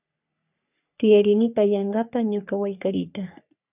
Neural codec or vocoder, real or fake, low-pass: codec, 44.1 kHz, 3.4 kbps, Pupu-Codec; fake; 3.6 kHz